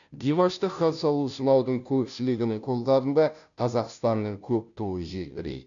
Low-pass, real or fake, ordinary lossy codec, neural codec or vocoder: 7.2 kHz; fake; none; codec, 16 kHz, 0.5 kbps, FunCodec, trained on Chinese and English, 25 frames a second